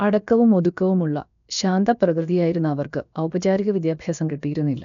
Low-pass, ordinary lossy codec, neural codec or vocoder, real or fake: 7.2 kHz; none; codec, 16 kHz, about 1 kbps, DyCAST, with the encoder's durations; fake